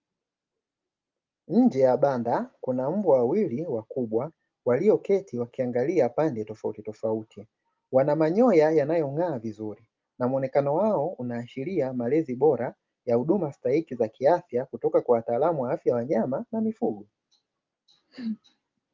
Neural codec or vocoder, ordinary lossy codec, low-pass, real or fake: none; Opus, 24 kbps; 7.2 kHz; real